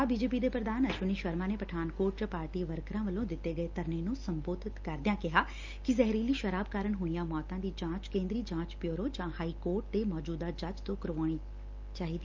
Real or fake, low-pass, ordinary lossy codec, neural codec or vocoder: real; 7.2 kHz; Opus, 24 kbps; none